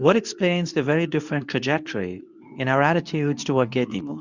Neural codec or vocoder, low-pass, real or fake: codec, 24 kHz, 0.9 kbps, WavTokenizer, medium speech release version 2; 7.2 kHz; fake